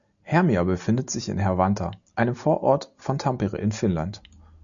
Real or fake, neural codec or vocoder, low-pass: real; none; 7.2 kHz